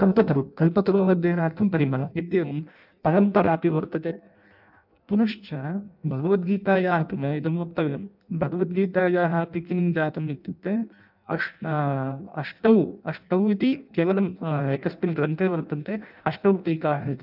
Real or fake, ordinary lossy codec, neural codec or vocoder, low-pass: fake; none; codec, 16 kHz in and 24 kHz out, 0.6 kbps, FireRedTTS-2 codec; 5.4 kHz